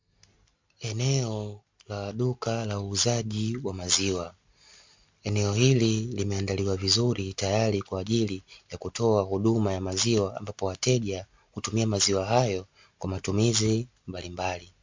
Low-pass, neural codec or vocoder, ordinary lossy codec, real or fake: 7.2 kHz; none; MP3, 64 kbps; real